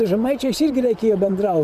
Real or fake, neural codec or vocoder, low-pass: fake; vocoder, 44.1 kHz, 128 mel bands, Pupu-Vocoder; 14.4 kHz